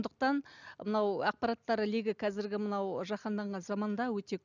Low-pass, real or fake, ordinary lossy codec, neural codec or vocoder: 7.2 kHz; real; none; none